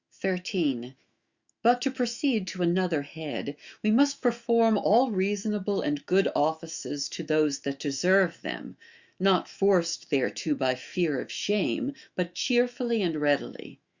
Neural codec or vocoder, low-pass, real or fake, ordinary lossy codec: autoencoder, 48 kHz, 128 numbers a frame, DAC-VAE, trained on Japanese speech; 7.2 kHz; fake; Opus, 64 kbps